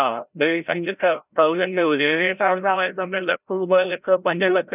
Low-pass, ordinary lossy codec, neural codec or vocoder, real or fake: 3.6 kHz; none; codec, 16 kHz, 0.5 kbps, FreqCodec, larger model; fake